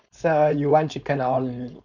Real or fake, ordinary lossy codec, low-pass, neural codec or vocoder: fake; none; 7.2 kHz; codec, 16 kHz, 4.8 kbps, FACodec